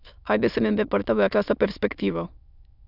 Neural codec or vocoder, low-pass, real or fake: autoencoder, 22.05 kHz, a latent of 192 numbers a frame, VITS, trained on many speakers; 5.4 kHz; fake